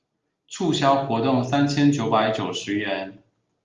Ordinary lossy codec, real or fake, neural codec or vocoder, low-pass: Opus, 32 kbps; real; none; 7.2 kHz